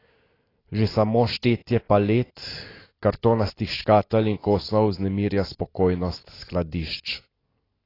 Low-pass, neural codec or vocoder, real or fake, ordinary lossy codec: 5.4 kHz; none; real; AAC, 24 kbps